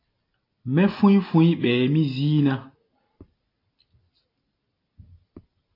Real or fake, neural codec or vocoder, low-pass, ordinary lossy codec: real; none; 5.4 kHz; AAC, 32 kbps